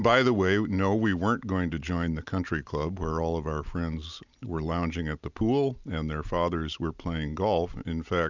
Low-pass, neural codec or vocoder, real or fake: 7.2 kHz; none; real